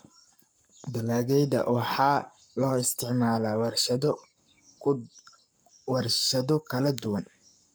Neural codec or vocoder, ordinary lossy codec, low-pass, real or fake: codec, 44.1 kHz, 7.8 kbps, Pupu-Codec; none; none; fake